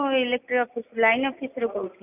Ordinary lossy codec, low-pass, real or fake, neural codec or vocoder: none; 3.6 kHz; real; none